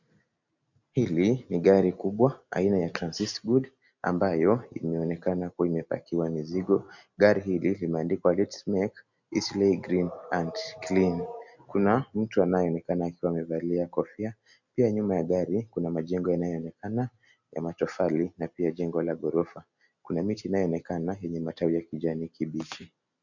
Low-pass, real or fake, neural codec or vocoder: 7.2 kHz; real; none